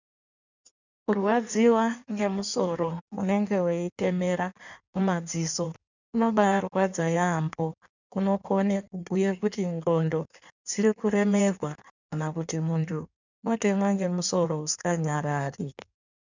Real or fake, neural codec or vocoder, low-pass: fake; codec, 16 kHz in and 24 kHz out, 1.1 kbps, FireRedTTS-2 codec; 7.2 kHz